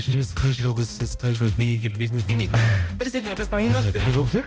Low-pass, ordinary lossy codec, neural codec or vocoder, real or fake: none; none; codec, 16 kHz, 0.5 kbps, X-Codec, HuBERT features, trained on balanced general audio; fake